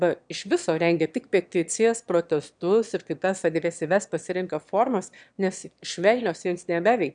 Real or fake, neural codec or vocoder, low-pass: fake; autoencoder, 22.05 kHz, a latent of 192 numbers a frame, VITS, trained on one speaker; 9.9 kHz